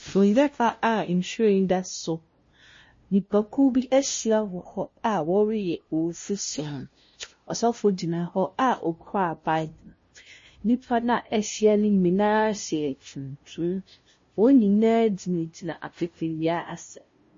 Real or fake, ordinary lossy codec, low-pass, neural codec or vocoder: fake; MP3, 32 kbps; 7.2 kHz; codec, 16 kHz, 0.5 kbps, FunCodec, trained on LibriTTS, 25 frames a second